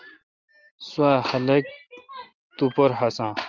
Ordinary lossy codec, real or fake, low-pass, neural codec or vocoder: Opus, 32 kbps; real; 7.2 kHz; none